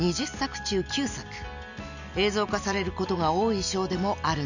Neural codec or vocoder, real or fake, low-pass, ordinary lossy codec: none; real; 7.2 kHz; none